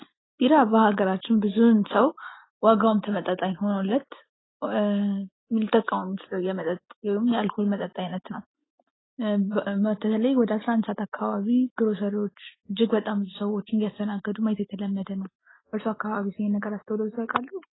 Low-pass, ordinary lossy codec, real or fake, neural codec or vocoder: 7.2 kHz; AAC, 16 kbps; real; none